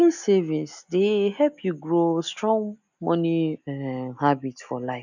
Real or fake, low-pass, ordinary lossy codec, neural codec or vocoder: real; 7.2 kHz; none; none